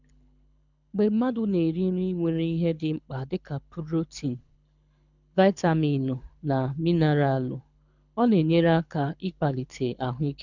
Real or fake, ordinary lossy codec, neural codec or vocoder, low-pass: fake; none; codec, 24 kHz, 6 kbps, HILCodec; 7.2 kHz